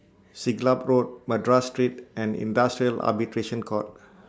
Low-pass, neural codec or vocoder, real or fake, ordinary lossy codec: none; none; real; none